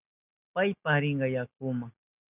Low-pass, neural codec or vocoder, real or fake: 3.6 kHz; none; real